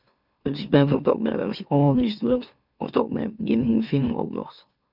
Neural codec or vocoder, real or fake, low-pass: autoencoder, 44.1 kHz, a latent of 192 numbers a frame, MeloTTS; fake; 5.4 kHz